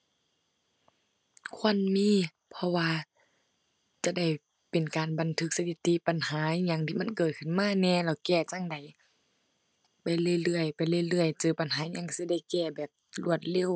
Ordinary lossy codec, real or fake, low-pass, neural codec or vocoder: none; real; none; none